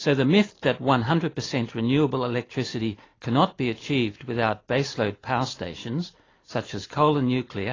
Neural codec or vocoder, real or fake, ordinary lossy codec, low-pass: none; real; AAC, 32 kbps; 7.2 kHz